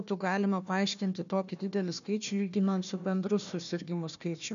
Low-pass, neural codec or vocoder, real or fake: 7.2 kHz; codec, 16 kHz, 1 kbps, FunCodec, trained on Chinese and English, 50 frames a second; fake